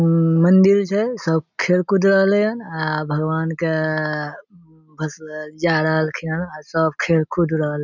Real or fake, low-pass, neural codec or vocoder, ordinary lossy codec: real; 7.2 kHz; none; none